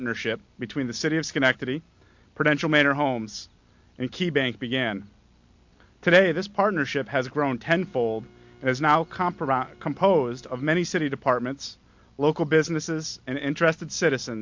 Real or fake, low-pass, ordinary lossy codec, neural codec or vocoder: real; 7.2 kHz; MP3, 48 kbps; none